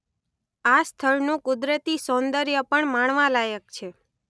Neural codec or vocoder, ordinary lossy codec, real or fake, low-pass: none; none; real; none